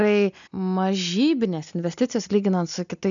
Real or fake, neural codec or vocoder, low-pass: real; none; 7.2 kHz